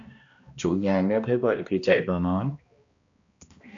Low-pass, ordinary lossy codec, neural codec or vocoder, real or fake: 7.2 kHz; AAC, 64 kbps; codec, 16 kHz, 1 kbps, X-Codec, HuBERT features, trained on balanced general audio; fake